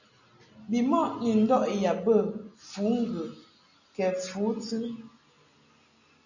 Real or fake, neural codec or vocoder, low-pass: real; none; 7.2 kHz